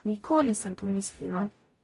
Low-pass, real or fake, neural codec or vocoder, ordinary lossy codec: 14.4 kHz; fake; codec, 44.1 kHz, 0.9 kbps, DAC; MP3, 48 kbps